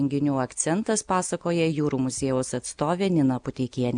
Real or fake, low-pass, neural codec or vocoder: real; 9.9 kHz; none